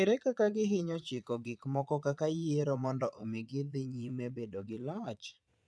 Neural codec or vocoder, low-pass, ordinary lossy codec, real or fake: vocoder, 22.05 kHz, 80 mel bands, Vocos; none; none; fake